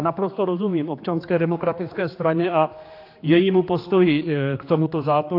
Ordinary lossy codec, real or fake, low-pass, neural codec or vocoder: AAC, 32 kbps; fake; 5.4 kHz; codec, 16 kHz, 2 kbps, X-Codec, HuBERT features, trained on general audio